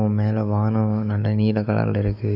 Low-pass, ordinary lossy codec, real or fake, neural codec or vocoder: 5.4 kHz; none; fake; autoencoder, 48 kHz, 128 numbers a frame, DAC-VAE, trained on Japanese speech